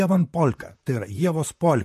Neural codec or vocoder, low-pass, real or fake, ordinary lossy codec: vocoder, 44.1 kHz, 128 mel bands, Pupu-Vocoder; 14.4 kHz; fake; MP3, 64 kbps